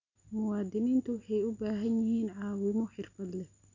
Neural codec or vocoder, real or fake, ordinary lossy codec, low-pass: none; real; none; 7.2 kHz